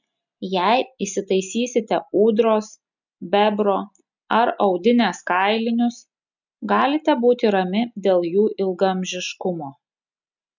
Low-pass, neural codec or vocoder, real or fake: 7.2 kHz; none; real